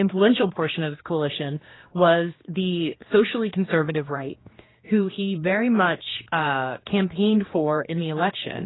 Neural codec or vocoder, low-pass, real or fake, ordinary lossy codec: codec, 16 kHz, 2 kbps, X-Codec, HuBERT features, trained on general audio; 7.2 kHz; fake; AAC, 16 kbps